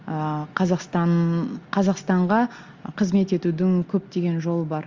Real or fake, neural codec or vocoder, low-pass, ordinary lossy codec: real; none; 7.2 kHz; Opus, 32 kbps